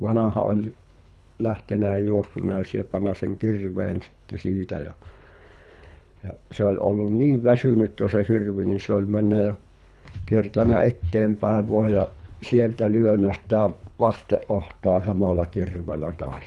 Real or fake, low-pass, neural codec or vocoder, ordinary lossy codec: fake; none; codec, 24 kHz, 3 kbps, HILCodec; none